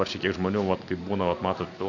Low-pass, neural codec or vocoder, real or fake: 7.2 kHz; none; real